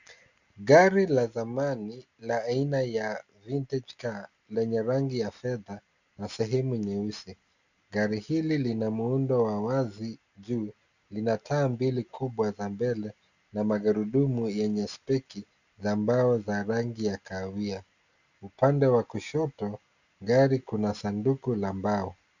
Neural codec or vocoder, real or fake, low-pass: none; real; 7.2 kHz